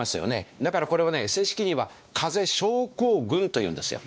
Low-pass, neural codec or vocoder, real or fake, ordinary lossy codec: none; codec, 16 kHz, 2 kbps, X-Codec, WavLM features, trained on Multilingual LibriSpeech; fake; none